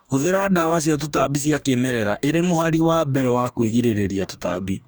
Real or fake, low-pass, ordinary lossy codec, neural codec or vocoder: fake; none; none; codec, 44.1 kHz, 2.6 kbps, DAC